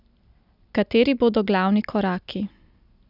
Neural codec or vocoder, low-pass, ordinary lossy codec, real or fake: none; 5.4 kHz; none; real